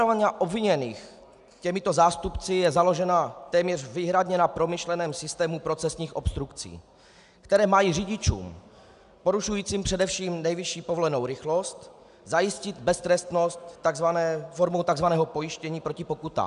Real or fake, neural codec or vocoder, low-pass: real; none; 10.8 kHz